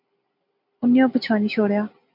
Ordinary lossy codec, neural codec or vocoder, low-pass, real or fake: MP3, 48 kbps; none; 5.4 kHz; real